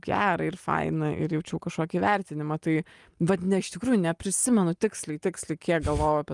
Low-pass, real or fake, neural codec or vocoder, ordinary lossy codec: 10.8 kHz; real; none; Opus, 32 kbps